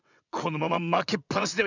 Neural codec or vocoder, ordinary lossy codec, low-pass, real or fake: vocoder, 22.05 kHz, 80 mel bands, WaveNeXt; none; 7.2 kHz; fake